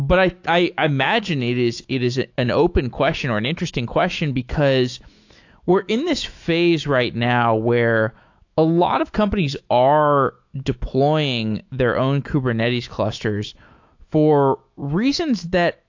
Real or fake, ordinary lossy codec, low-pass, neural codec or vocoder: fake; AAC, 48 kbps; 7.2 kHz; autoencoder, 48 kHz, 128 numbers a frame, DAC-VAE, trained on Japanese speech